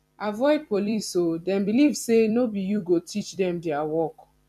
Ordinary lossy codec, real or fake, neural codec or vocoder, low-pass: none; fake; vocoder, 44.1 kHz, 128 mel bands every 256 samples, BigVGAN v2; 14.4 kHz